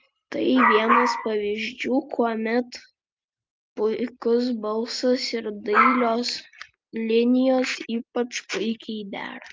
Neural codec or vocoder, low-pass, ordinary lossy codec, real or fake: none; 7.2 kHz; Opus, 32 kbps; real